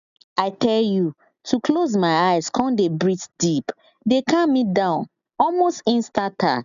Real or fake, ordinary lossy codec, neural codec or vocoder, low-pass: real; none; none; 7.2 kHz